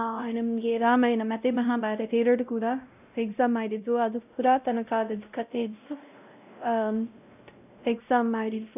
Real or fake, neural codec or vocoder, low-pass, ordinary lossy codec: fake; codec, 16 kHz, 0.5 kbps, X-Codec, WavLM features, trained on Multilingual LibriSpeech; 3.6 kHz; none